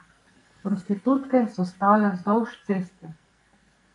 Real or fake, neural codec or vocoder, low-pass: fake; codec, 44.1 kHz, 2.6 kbps, SNAC; 10.8 kHz